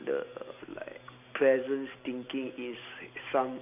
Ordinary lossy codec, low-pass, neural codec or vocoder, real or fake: none; 3.6 kHz; none; real